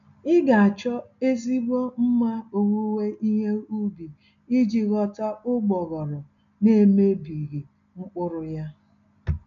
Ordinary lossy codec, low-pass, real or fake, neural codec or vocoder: MP3, 96 kbps; 7.2 kHz; real; none